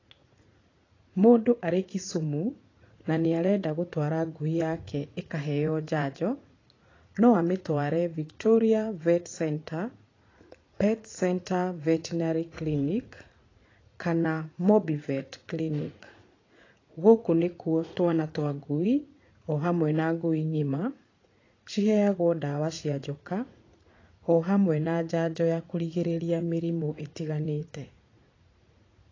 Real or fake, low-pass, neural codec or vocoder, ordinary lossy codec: fake; 7.2 kHz; vocoder, 44.1 kHz, 80 mel bands, Vocos; AAC, 32 kbps